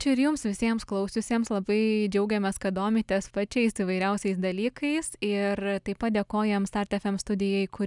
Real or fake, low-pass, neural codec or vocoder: real; 10.8 kHz; none